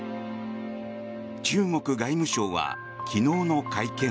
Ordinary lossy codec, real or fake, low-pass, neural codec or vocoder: none; real; none; none